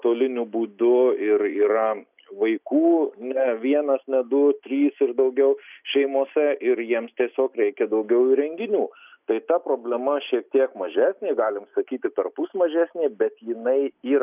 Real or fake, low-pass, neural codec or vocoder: real; 3.6 kHz; none